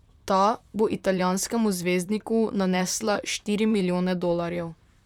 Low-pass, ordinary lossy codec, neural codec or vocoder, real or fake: 19.8 kHz; none; vocoder, 44.1 kHz, 128 mel bands, Pupu-Vocoder; fake